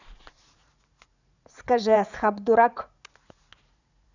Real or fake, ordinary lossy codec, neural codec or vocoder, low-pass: fake; none; vocoder, 44.1 kHz, 80 mel bands, Vocos; 7.2 kHz